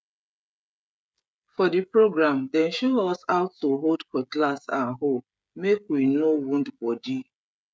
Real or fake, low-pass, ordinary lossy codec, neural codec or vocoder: fake; none; none; codec, 16 kHz, 16 kbps, FreqCodec, smaller model